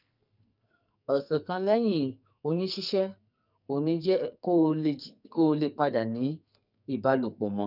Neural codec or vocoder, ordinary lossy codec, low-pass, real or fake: codec, 44.1 kHz, 2.6 kbps, SNAC; none; 5.4 kHz; fake